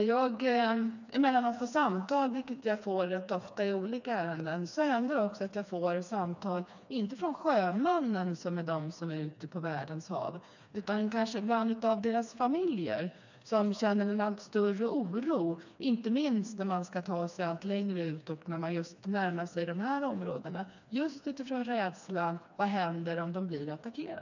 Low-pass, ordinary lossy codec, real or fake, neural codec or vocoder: 7.2 kHz; none; fake; codec, 16 kHz, 2 kbps, FreqCodec, smaller model